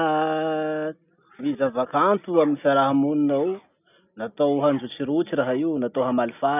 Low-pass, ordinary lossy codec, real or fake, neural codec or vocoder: 3.6 kHz; none; real; none